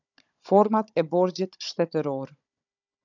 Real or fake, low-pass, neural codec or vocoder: fake; 7.2 kHz; codec, 16 kHz, 16 kbps, FunCodec, trained on Chinese and English, 50 frames a second